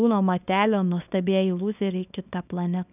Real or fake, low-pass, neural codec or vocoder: fake; 3.6 kHz; codec, 24 kHz, 0.9 kbps, WavTokenizer, small release